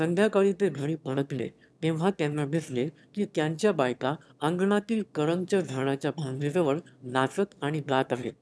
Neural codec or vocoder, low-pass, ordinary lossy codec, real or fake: autoencoder, 22.05 kHz, a latent of 192 numbers a frame, VITS, trained on one speaker; none; none; fake